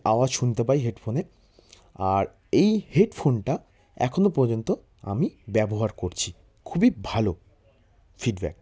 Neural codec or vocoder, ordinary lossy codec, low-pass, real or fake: none; none; none; real